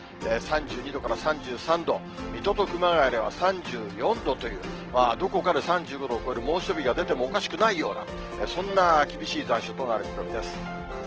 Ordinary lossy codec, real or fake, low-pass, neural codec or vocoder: Opus, 16 kbps; real; 7.2 kHz; none